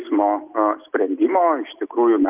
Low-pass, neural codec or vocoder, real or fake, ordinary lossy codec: 3.6 kHz; none; real; Opus, 24 kbps